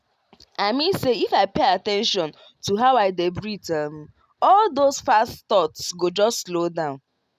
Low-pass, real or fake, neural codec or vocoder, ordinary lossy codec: 14.4 kHz; real; none; none